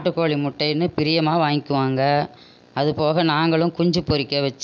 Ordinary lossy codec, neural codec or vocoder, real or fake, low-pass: none; none; real; none